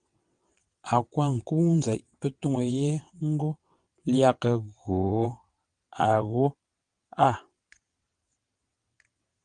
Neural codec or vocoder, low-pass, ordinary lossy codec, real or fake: vocoder, 22.05 kHz, 80 mel bands, WaveNeXt; 9.9 kHz; Opus, 32 kbps; fake